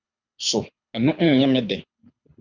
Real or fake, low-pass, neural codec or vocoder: fake; 7.2 kHz; codec, 24 kHz, 6 kbps, HILCodec